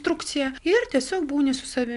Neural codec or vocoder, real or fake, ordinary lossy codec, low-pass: none; real; MP3, 64 kbps; 10.8 kHz